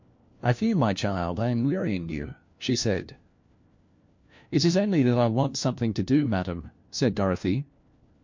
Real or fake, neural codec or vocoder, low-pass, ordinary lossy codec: fake; codec, 16 kHz, 1 kbps, FunCodec, trained on LibriTTS, 50 frames a second; 7.2 kHz; MP3, 48 kbps